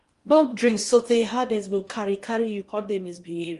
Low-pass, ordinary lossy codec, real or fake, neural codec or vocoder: 10.8 kHz; Opus, 32 kbps; fake; codec, 16 kHz in and 24 kHz out, 0.8 kbps, FocalCodec, streaming, 65536 codes